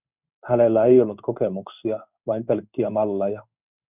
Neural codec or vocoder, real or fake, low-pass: codec, 16 kHz in and 24 kHz out, 1 kbps, XY-Tokenizer; fake; 3.6 kHz